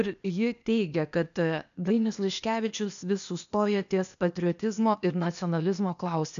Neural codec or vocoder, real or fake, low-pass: codec, 16 kHz, 0.8 kbps, ZipCodec; fake; 7.2 kHz